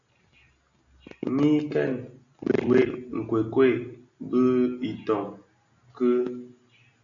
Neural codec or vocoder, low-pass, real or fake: none; 7.2 kHz; real